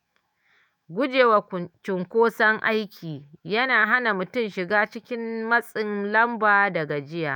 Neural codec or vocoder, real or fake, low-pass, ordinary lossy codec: autoencoder, 48 kHz, 128 numbers a frame, DAC-VAE, trained on Japanese speech; fake; none; none